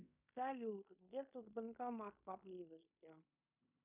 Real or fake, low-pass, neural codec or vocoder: fake; 3.6 kHz; codec, 16 kHz in and 24 kHz out, 0.9 kbps, LongCat-Audio-Codec, fine tuned four codebook decoder